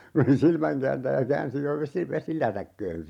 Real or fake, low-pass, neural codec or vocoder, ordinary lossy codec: fake; 19.8 kHz; vocoder, 44.1 kHz, 128 mel bands every 512 samples, BigVGAN v2; none